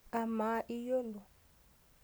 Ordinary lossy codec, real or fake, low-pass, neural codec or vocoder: none; real; none; none